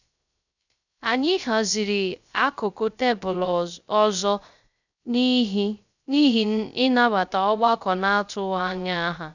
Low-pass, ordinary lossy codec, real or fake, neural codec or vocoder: 7.2 kHz; none; fake; codec, 16 kHz, 0.3 kbps, FocalCodec